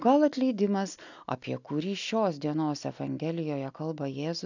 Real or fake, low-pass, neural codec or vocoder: real; 7.2 kHz; none